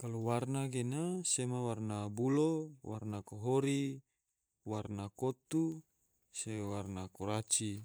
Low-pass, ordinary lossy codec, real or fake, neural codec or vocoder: none; none; fake; vocoder, 44.1 kHz, 128 mel bands every 512 samples, BigVGAN v2